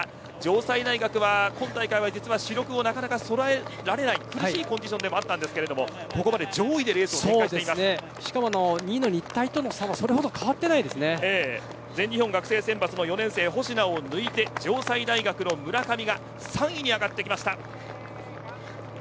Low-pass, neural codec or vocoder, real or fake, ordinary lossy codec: none; none; real; none